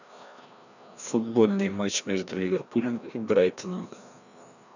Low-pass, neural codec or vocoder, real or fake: 7.2 kHz; codec, 16 kHz, 1 kbps, FreqCodec, larger model; fake